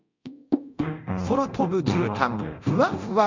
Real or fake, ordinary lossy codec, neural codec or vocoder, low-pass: fake; MP3, 64 kbps; codec, 24 kHz, 0.9 kbps, DualCodec; 7.2 kHz